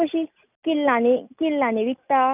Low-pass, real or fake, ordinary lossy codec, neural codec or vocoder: 3.6 kHz; real; none; none